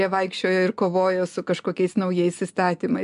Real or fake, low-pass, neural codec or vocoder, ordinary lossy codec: real; 10.8 kHz; none; MP3, 64 kbps